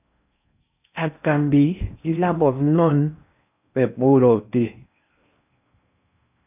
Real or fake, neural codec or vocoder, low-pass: fake; codec, 16 kHz in and 24 kHz out, 0.6 kbps, FocalCodec, streaming, 4096 codes; 3.6 kHz